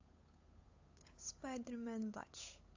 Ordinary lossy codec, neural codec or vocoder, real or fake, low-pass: AAC, 48 kbps; codec, 16 kHz, 8 kbps, FunCodec, trained on Chinese and English, 25 frames a second; fake; 7.2 kHz